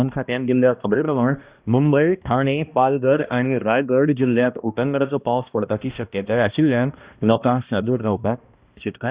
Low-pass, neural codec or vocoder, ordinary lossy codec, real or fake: 3.6 kHz; codec, 16 kHz, 1 kbps, X-Codec, HuBERT features, trained on balanced general audio; Opus, 64 kbps; fake